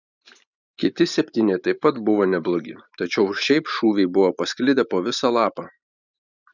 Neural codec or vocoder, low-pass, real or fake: vocoder, 44.1 kHz, 128 mel bands every 512 samples, BigVGAN v2; 7.2 kHz; fake